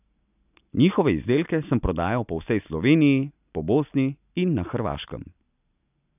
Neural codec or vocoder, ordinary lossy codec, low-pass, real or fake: none; none; 3.6 kHz; real